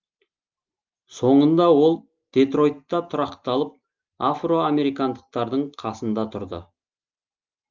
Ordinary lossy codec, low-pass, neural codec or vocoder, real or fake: Opus, 32 kbps; 7.2 kHz; none; real